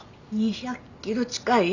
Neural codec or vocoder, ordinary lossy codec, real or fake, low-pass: none; none; real; 7.2 kHz